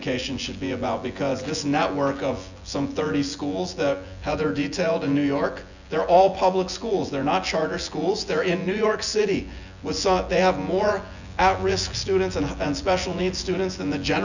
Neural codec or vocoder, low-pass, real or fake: vocoder, 24 kHz, 100 mel bands, Vocos; 7.2 kHz; fake